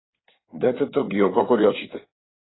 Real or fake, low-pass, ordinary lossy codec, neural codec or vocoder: fake; 7.2 kHz; AAC, 16 kbps; codec, 16 kHz in and 24 kHz out, 2.2 kbps, FireRedTTS-2 codec